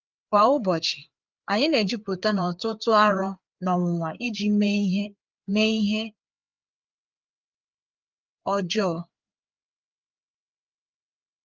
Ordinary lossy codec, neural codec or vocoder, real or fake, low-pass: Opus, 32 kbps; codec, 16 kHz, 4 kbps, FreqCodec, larger model; fake; 7.2 kHz